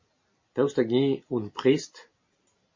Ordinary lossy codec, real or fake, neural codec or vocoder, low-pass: MP3, 32 kbps; real; none; 7.2 kHz